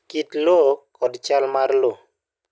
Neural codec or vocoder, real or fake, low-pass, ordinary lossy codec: none; real; none; none